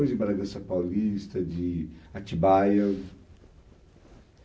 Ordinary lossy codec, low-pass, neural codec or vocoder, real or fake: none; none; none; real